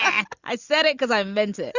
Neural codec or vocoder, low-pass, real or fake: none; 7.2 kHz; real